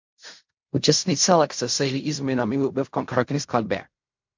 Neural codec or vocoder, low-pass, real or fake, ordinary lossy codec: codec, 16 kHz in and 24 kHz out, 0.4 kbps, LongCat-Audio-Codec, fine tuned four codebook decoder; 7.2 kHz; fake; MP3, 48 kbps